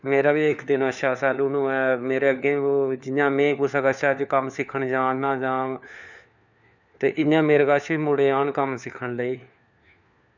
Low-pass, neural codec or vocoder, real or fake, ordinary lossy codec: 7.2 kHz; codec, 16 kHz, 4 kbps, FunCodec, trained on LibriTTS, 50 frames a second; fake; none